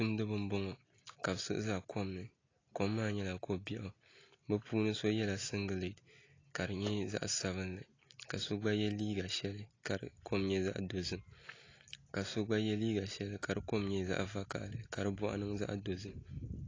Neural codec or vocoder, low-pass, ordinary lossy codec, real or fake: none; 7.2 kHz; AAC, 32 kbps; real